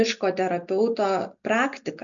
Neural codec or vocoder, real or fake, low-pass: none; real; 7.2 kHz